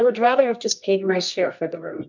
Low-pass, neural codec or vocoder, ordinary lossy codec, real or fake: 7.2 kHz; codec, 24 kHz, 0.9 kbps, WavTokenizer, medium music audio release; MP3, 64 kbps; fake